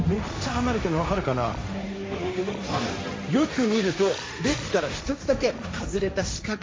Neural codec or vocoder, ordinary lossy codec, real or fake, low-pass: codec, 16 kHz, 1.1 kbps, Voila-Tokenizer; none; fake; none